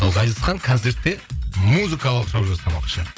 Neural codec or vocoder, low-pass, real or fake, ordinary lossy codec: codec, 16 kHz, 8 kbps, FreqCodec, larger model; none; fake; none